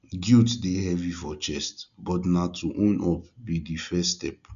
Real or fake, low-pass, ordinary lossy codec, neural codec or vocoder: real; 7.2 kHz; none; none